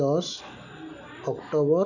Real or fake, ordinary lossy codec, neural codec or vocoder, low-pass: real; none; none; 7.2 kHz